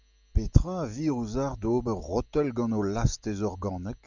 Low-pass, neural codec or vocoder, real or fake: 7.2 kHz; none; real